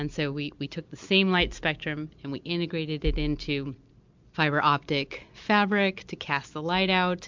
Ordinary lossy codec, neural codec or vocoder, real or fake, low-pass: MP3, 64 kbps; none; real; 7.2 kHz